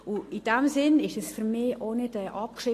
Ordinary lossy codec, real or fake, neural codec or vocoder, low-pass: AAC, 48 kbps; real; none; 14.4 kHz